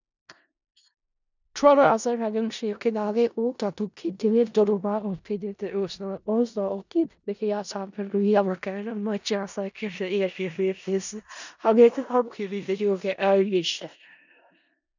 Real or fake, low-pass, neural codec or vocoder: fake; 7.2 kHz; codec, 16 kHz in and 24 kHz out, 0.4 kbps, LongCat-Audio-Codec, four codebook decoder